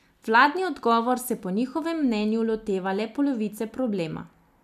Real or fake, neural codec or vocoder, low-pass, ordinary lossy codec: real; none; 14.4 kHz; none